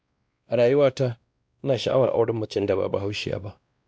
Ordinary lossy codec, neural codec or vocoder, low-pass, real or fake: none; codec, 16 kHz, 1 kbps, X-Codec, WavLM features, trained on Multilingual LibriSpeech; none; fake